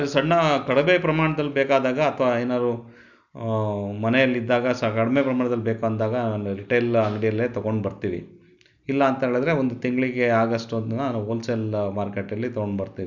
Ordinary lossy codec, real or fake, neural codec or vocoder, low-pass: none; real; none; 7.2 kHz